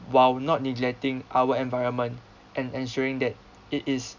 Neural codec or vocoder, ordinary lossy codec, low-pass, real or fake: none; none; 7.2 kHz; real